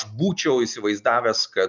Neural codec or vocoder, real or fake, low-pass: none; real; 7.2 kHz